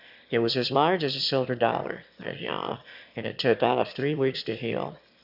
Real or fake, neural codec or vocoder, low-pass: fake; autoencoder, 22.05 kHz, a latent of 192 numbers a frame, VITS, trained on one speaker; 5.4 kHz